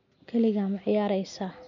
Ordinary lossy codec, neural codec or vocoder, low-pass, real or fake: none; none; 7.2 kHz; real